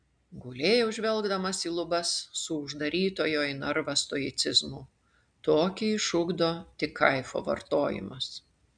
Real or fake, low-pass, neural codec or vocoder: real; 9.9 kHz; none